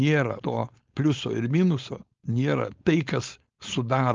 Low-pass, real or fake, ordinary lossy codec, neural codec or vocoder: 7.2 kHz; fake; Opus, 24 kbps; codec, 16 kHz, 4.8 kbps, FACodec